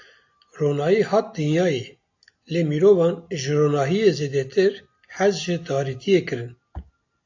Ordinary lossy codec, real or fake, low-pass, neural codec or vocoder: AAC, 48 kbps; real; 7.2 kHz; none